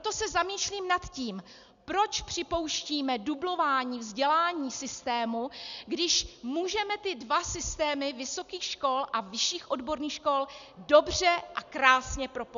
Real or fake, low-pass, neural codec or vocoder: real; 7.2 kHz; none